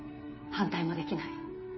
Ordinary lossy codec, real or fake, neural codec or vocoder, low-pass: MP3, 24 kbps; fake; codec, 16 kHz, 16 kbps, FreqCodec, smaller model; 7.2 kHz